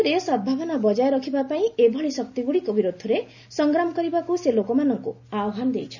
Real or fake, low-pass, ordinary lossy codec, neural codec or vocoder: real; 7.2 kHz; none; none